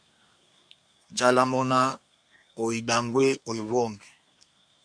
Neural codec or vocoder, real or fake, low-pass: codec, 24 kHz, 1 kbps, SNAC; fake; 9.9 kHz